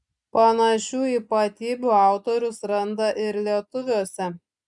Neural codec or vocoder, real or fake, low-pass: none; real; 10.8 kHz